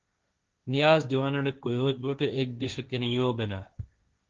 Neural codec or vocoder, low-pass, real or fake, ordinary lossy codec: codec, 16 kHz, 1.1 kbps, Voila-Tokenizer; 7.2 kHz; fake; Opus, 32 kbps